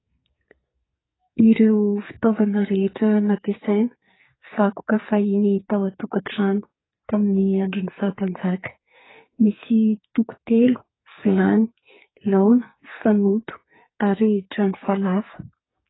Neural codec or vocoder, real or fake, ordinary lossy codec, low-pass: codec, 44.1 kHz, 2.6 kbps, SNAC; fake; AAC, 16 kbps; 7.2 kHz